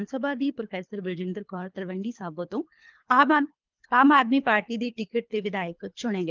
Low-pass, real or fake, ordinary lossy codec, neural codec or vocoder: 7.2 kHz; fake; Opus, 32 kbps; codec, 24 kHz, 3 kbps, HILCodec